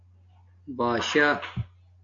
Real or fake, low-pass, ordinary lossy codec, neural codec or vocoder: real; 7.2 kHz; AAC, 64 kbps; none